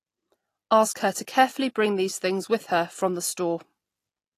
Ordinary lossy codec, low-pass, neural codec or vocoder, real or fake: AAC, 48 kbps; 14.4 kHz; none; real